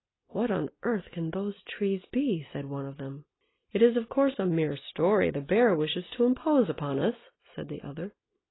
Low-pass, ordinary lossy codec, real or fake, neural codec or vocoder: 7.2 kHz; AAC, 16 kbps; real; none